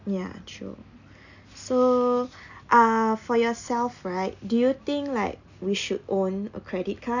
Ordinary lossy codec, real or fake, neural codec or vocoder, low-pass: none; real; none; 7.2 kHz